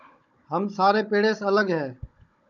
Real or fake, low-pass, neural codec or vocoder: fake; 7.2 kHz; codec, 16 kHz, 16 kbps, FunCodec, trained on Chinese and English, 50 frames a second